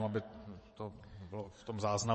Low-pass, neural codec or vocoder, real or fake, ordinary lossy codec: 9.9 kHz; vocoder, 22.05 kHz, 80 mel bands, WaveNeXt; fake; MP3, 32 kbps